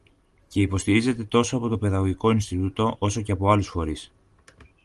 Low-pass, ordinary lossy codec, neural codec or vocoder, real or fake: 10.8 kHz; Opus, 24 kbps; none; real